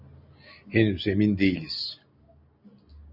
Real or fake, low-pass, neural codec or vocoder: real; 5.4 kHz; none